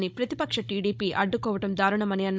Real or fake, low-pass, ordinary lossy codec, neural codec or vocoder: fake; none; none; codec, 16 kHz, 16 kbps, FunCodec, trained on Chinese and English, 50 frames a second